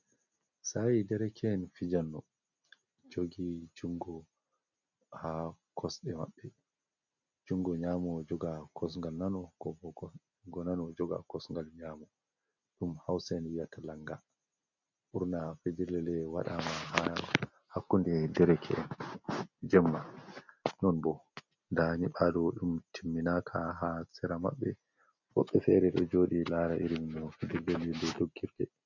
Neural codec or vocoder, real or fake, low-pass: none; real; 7.2 kHz